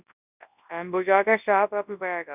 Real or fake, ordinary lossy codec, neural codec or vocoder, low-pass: fake; none; codec, 24 kHz, 0.9 kbps, WavTokenizer, large speech release; 3.6 kHz